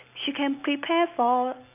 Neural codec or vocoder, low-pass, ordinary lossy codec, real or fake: none; 3.6 kHz; none; real